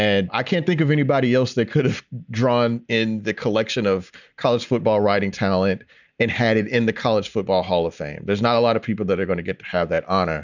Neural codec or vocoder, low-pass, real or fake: none; 7.2 kHz; real